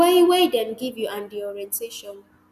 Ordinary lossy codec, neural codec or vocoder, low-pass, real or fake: none; none; 14.4 kHz; real